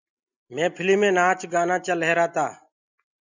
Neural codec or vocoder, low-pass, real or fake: none; 7.2 kHz; real